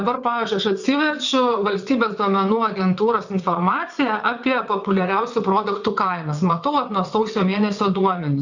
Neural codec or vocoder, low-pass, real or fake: vocoder, 22.05 kHz, 80 mel bands, WaveNeXt; 7.2 kHz; fake